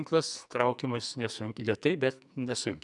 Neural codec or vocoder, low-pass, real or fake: codec, 44.1 kHz, 2.6 kbps, SNAC; 10.8 kHz; fake